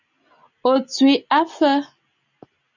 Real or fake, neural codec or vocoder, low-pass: real; none; 7.2 kHz